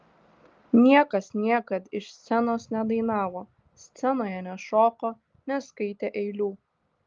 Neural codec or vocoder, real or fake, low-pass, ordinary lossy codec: none; real; 7.2 kHz; Opus, 32 kbps